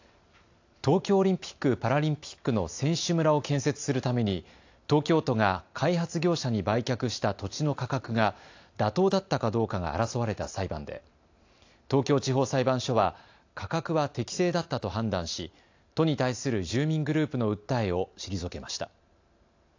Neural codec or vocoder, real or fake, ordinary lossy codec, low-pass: none; real; AAC, 48 kbps; 7.2 kHz